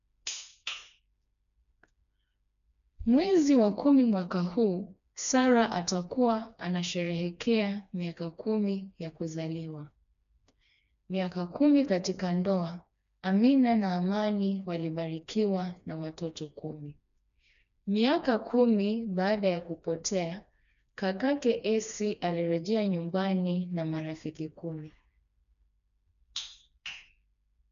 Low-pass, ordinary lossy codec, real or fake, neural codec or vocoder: 7.2 kHz; none; fake; codec, 16 kHz, 2 kbps, FreqCodec, smaller model